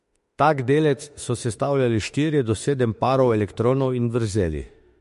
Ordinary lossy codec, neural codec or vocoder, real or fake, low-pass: MP3, 48 kbps; autoencoder, 48 kHz, 32 numbers a frame, DAC-VAE, trained on Japanese speech; fake; 14.4 kHz